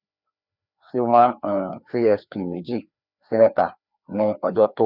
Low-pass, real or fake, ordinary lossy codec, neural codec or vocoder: 5.4 kHz; fake; none; codec, 16 kHz, 2 kbps, FreqCodec, larger model